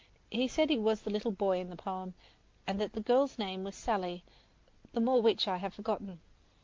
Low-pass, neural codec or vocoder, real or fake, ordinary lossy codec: 7.2 kHz; none; real; Opus, 24 kbps